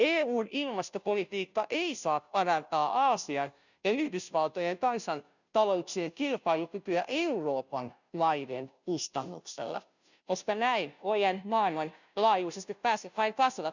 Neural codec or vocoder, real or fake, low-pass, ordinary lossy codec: codec, 16 kHz, 0.5 kbps, FunCodec, trained on Chinese and English, 25 frames a second; fake; 7.2 kHz; none